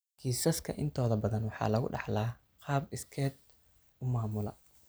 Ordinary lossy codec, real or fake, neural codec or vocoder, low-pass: none; real; none; none